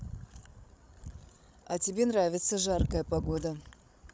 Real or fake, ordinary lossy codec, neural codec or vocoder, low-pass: fake; none; codec, 16 kHz, 16 kbps, FreqCodec, larger model; none